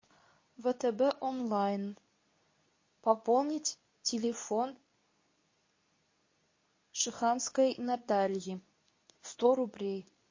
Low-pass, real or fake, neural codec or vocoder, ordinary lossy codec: 7.2 kHz; fake; codec, 24 kHz, 0.9 kbps, WavTokenizer, medium speech release version 2; MP3, 32 kbps